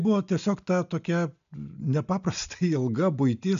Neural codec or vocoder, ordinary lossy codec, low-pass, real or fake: none; AAC, 96 kbps; 7.2 kHz; real